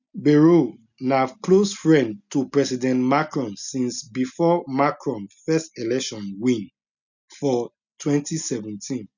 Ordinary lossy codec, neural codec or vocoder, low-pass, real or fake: none; none; 7.2 kHz; real